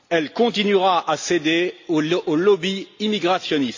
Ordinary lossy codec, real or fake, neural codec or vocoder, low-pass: AAC, 48 kbps; real; none; 7.2 kHz